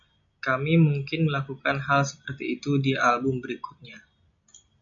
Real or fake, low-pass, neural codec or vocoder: real; 7.2 kHz; none